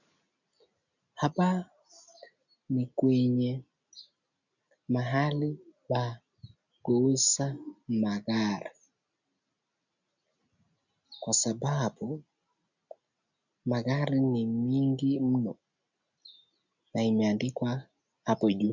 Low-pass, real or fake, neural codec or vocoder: 7.2 kHz; real; none